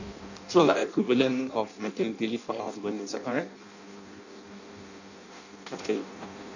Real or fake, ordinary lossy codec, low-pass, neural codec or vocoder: fake; none; 7.2 kHz; codec, 16 kHz in and 24 kHz out, 0.6 kbps, FireRedTTS-2 codec